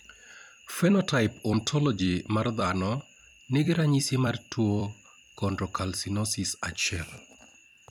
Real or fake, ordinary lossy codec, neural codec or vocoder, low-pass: fake; none; vocoder, 44.1 kHz, 128 mel bands every 256 samples, BigVGAN v2; 19.8 kHz